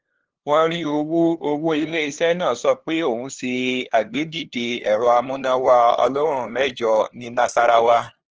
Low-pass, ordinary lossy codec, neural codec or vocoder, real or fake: 7.2 kHz; Opus, 16 kbps; codec, 16 kHz, 2 kbps, FunCodec, trained on LibriTTS, 25 frames a second; fake